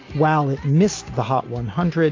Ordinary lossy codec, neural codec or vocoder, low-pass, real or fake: AAC, 32 kbps; none; 7.2 kHz; real